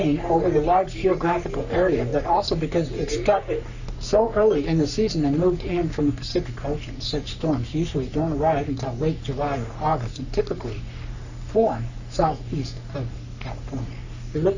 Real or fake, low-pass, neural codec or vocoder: fake; 7.2 kHz; codec, 44.1 kHz, 3.4 kbps, Pupu-Codec